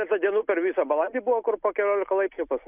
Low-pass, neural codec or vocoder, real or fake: 3.6 kHz; none; real